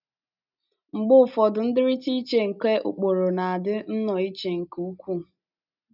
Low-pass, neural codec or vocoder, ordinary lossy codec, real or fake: 5.4 kHz; none; none; real